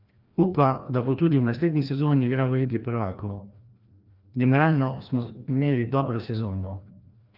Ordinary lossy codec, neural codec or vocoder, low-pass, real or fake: Opus, 32 kbps; codec, 16 kHz, 1 kbps, FreqCodec, larger model; 5.4 kHz; fake